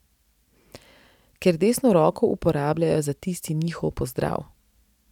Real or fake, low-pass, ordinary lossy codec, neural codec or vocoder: real; 19.8 kHz; none; none